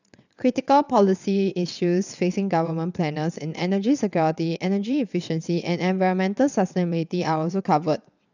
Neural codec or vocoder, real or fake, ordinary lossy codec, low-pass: vocoder, 22.05 kHz, 80 mel bands, WaveNeXt; fake; none; 7.2 kHz